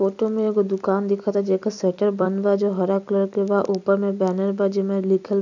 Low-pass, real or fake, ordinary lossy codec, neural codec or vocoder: 7.2 kHz; fake; none; vocoder, 44.1 kHz, 128 mel bands every 256 samples, BigVGAN v2